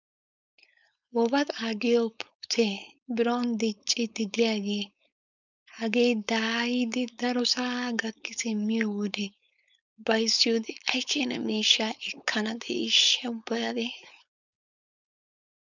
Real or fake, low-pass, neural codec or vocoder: fake; 7.2 kHz; codec, 16 kHz, 4.8 kbps, FACodec